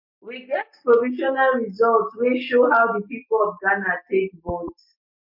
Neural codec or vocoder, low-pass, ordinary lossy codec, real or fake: none; 5.4 kHz; MP3, 32 kbps; real